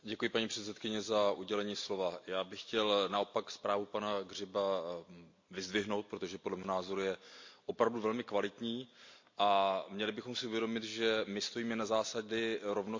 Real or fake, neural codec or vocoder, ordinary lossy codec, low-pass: real; none; MP3, 48 kbps; 7.2 kHz